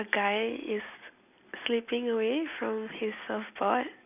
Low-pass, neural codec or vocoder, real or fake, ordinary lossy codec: 3.6 kHz; none; real; none